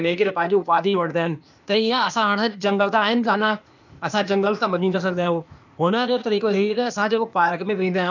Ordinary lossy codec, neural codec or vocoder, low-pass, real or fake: none; codec, 16 kHz, 0.8 kbps, ZipCodec; 7.2 kHz; fake